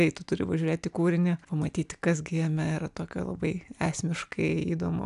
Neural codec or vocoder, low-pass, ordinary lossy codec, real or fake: none; 10.8 kHz; AAC, 96 kbps; real